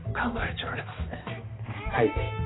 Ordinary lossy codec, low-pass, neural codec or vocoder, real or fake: AAC, 16 kbps; 7.2 kHz; codec, 16 kHz, 2 kbps, X-Codec, HuBERT features, trained on balanced general audio; fake